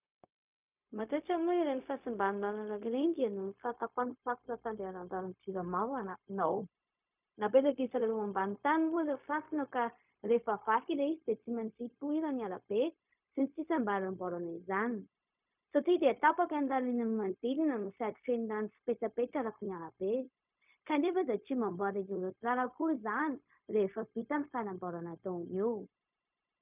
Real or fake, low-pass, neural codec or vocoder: fake; 3.6 kHz; codec, 16 kHz, 0.4 kbps, LongCat-Audio-Codec